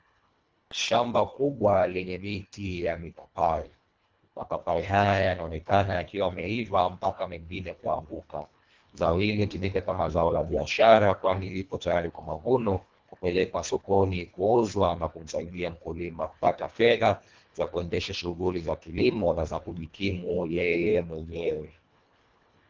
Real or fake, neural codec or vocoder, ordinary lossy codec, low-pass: fake; codec, 24 kHz, 1.5 kbps, HILCodec; Opus, 24 kbps; 7.2 kHz